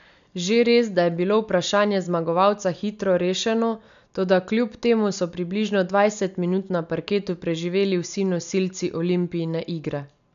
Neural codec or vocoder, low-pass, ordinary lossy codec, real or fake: none; 7.2 kHz; none; real